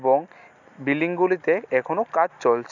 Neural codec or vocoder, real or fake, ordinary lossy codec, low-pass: none; real; none; 7.2 kHz